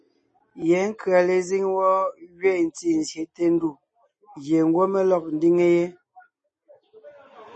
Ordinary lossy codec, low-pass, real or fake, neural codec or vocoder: MP3, 32 kbps; 10.8 kHz; real; none